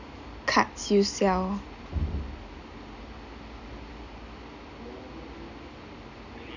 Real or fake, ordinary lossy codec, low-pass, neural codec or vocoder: real; none; 7.2 kHz; none